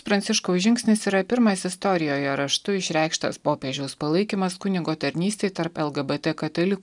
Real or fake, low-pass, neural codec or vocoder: real; 10.8 kHz; none